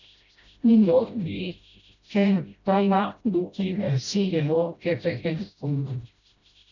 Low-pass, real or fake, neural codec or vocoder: 7.2 kHz; fake; codec, 16 kHz, 0.5 kbps, FreqCodec, smaller model